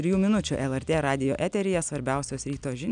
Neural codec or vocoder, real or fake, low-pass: none; real; 9.9 kHz